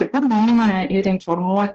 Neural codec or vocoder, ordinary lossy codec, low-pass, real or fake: codec, 16 kHz, 1 kbps, X-Codec, HuBERT features, trained on balanced general audio; Opus, 16 kbps; 7.2 kHz; fake